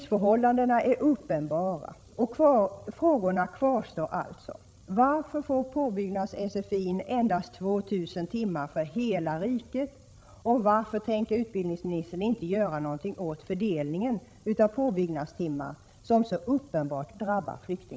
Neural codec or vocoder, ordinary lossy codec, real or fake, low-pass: codec, 16 kHz, 16 kbps, FreqCodec, larger model; none; fake; none